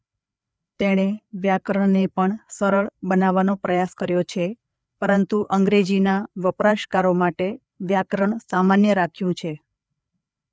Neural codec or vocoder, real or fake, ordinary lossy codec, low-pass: codec, 16 kHz, 4 kbps, FreqCodec, larger model; fake; none; none